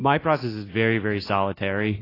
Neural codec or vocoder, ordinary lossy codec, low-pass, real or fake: none; AAC, 24 kbps; 5.4 kHz; real